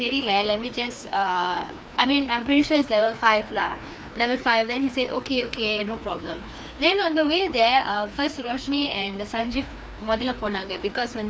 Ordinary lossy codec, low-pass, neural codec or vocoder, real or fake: none; none; codec, 16 kHz, 2 kbps, FreqCodec, larger model; fake